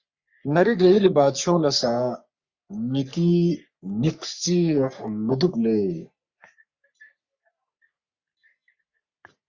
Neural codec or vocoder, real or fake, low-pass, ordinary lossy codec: codec, 44.1 kHz, 3.4 kbps, Pupu-Codec; fake; 7.2 kHz; Opus, 64 kbps